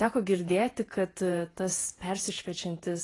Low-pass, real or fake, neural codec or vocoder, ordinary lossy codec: 10.8 kHz; fake; vocoder, 48 kHz, 128 mel bands, Vocos; AAC, 32 kbps